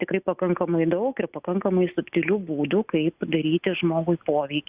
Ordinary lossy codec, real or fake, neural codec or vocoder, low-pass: Opus, 64 kbps; real; none; 3.6 kHz